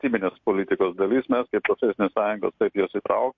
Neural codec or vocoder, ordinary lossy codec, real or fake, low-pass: none; MP3, 48 kbps; real; 7.2 kHz